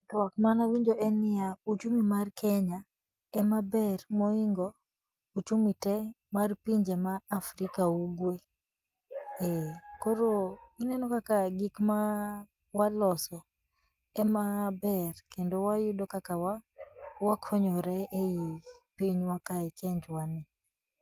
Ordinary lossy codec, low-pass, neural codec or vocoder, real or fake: Opus, 32 kbps; 14.4 kHz; none; real